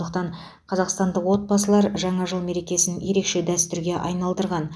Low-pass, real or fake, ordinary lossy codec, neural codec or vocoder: none; real; none; none